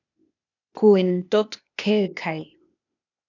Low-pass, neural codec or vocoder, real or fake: 7.2 kHz; codec, 16 kHz, 0.8 kbps, ZipCodec; fake